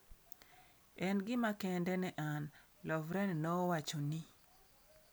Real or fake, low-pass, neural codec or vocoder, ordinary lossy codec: real; none; none; none